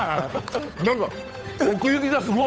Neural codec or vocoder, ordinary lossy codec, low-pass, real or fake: codec, 16 kHz, 8 kbps, FunCodec, trained on Chinese and English, 25 frames a second; none; none; fake